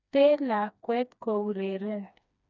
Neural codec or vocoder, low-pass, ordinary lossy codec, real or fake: codec, 16 kHz, 2 kbps, FreqCodec, smaller model; 7.2 kHz; none; fake